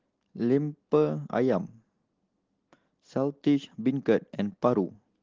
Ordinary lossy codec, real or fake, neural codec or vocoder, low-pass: Opus, 16 kbps; real; none; 7.2 kHz